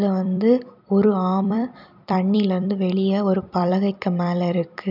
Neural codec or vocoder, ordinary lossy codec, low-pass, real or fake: vocoder, 44.1 kHz, 128 mel bands every 256 samples, BigVGAN v2; none; 5.4 kHz; fake